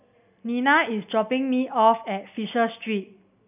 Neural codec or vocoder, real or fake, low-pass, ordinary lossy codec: none; real; 3.6 kHz; none